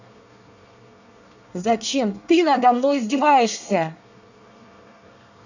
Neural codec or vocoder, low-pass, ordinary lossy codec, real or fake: codec, 24 kHz, 1 kbps, SNAC; 7.2 kHz; none; fake